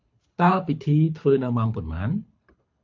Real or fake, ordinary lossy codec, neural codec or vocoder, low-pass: fake; MP3, 48 kbps; codec, 24 kHz, 6 kbps, HILCodec; 7.2 kHz